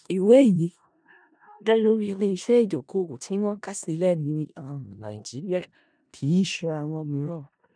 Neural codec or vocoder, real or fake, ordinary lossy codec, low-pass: codec, 16 kHz in and 24 kHz out, 0.4 kbps, LongCat-Audio-Codec, four codebook decoder; fake; none; 9.9 kHz